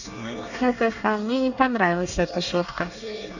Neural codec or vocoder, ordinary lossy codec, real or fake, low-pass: codec, 24 kHz, 1 kbps, SNAC; none; fake; 7.2 kHz